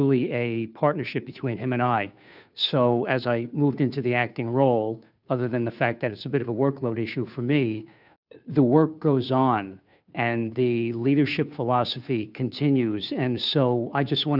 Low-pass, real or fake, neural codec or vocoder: 5.4 kHz; fake; codec, 16 kHz, 2 kbps, FunCodec, trained on Chinese and English, 25 frames a second